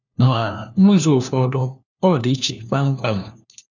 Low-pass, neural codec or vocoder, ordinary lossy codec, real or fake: 7.2 kHz; codec, 16 kHz, 1 kbps, FunCodec, trained on LibriTTS, 50 frames a second; none; fake